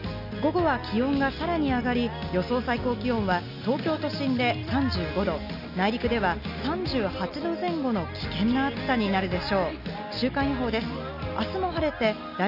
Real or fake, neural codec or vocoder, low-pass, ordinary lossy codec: real; none; 5.4 kHz; none